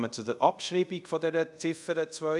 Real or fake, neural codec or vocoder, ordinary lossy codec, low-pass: fake; codec, 24 kHz, 0.5 kbps, DualCodec; none; 10.8 kHz